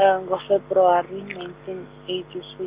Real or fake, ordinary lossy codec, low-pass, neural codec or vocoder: real; Opus, 64 kbps; 3.6 kHz; none